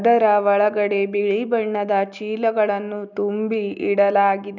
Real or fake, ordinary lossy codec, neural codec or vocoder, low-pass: real; none; none; 7.2 kHz